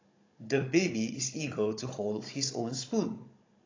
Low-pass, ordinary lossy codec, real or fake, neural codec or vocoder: 7.2 kHz; AAC, 32 kbps; fake; codec, 16 kHz, 16 kbps, FunCodec, trained on Chinese and English, 50 frames a second